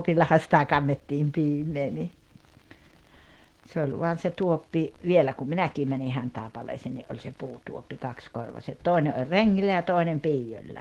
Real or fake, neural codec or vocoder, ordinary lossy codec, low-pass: fake; autoencoder, 48 kHz, 128 numbers a frame, DAC-VAE, trained on Japanese speech; Opus, 16 kbps; 19.8 kHz